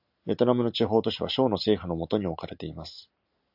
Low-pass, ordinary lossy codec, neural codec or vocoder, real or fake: 5.4 kHz; AAC, 48 kbps; none; real